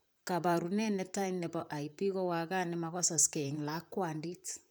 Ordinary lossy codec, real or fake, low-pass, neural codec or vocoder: none; fake; none; vocoder, 44.1 kHz, 128 mel bands, Pupu-Vocoder